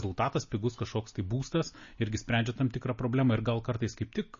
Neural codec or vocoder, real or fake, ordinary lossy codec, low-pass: none; real; MP3, 32 kbps; 7.2 kHz